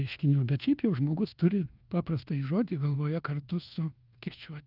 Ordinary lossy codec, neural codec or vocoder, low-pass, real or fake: Opus, 32 kbps; codec, 24 kHz, 1.2 kbps, DualCodec; 5.4 kHz; fake